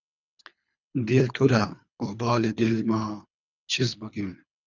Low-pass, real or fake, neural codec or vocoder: 7.2 kHz; fake; codec, 24 kHz, 3 kbps, HILCodec